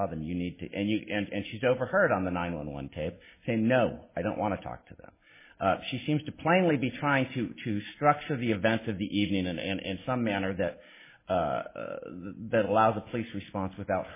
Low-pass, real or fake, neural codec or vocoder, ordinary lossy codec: 3.6 kHz; real; none; MP3, 16 kbps